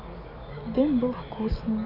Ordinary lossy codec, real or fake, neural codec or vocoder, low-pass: none; real; none; 5.4 kHz